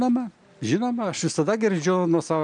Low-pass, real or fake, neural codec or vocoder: 9.9 kHz; real; none